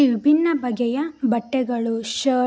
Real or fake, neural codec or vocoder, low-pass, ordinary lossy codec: real; none; none; none